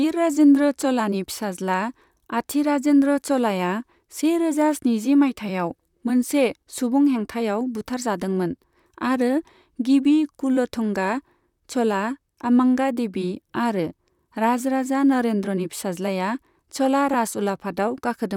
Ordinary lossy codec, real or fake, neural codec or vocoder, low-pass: none; fake; vocoder, 44.1 kHz, 128 mel bands, Pupu-Vocoder; 19.8 kHz